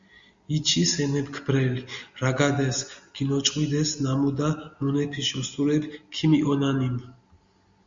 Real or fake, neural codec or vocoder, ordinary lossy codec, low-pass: real; none; Opus, 64 kbps; 7.2 kHz